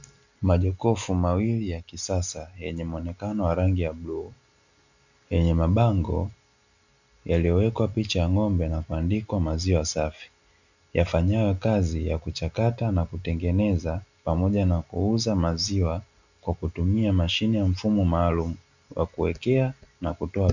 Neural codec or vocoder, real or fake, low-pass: none; real; 7.2 kHz